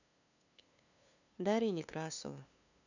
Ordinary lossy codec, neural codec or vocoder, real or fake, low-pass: none; codec, 16 kHz, 2 kbps, FunCodec, trained on LibriTTS, 25 frames a second; fake; 7.2 kHz